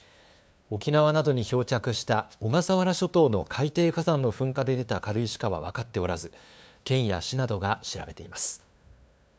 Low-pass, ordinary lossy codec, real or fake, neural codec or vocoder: none; none; fake; codec, 16 kHz, 2 kbps, FunCodec, trained on LibriTTS, 25 frames a second